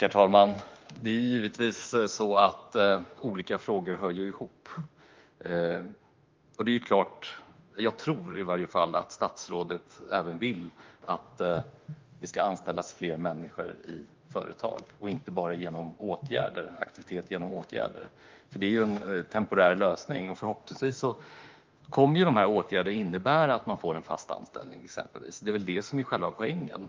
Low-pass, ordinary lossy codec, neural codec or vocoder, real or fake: 7.2 kHz; Opus, 32 kbps; autoencoder, 48 kHz, 32 numbers a frame, DAC-VAE, trained on Japanese speech; fake